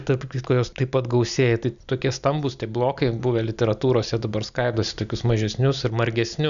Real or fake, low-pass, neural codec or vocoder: real; 7.2 kHz; none